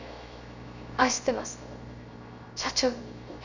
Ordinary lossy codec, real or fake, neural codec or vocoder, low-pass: none; fake; codec, 16 kHz, 0.3 kbps, FocalCodec; 7.2 kHz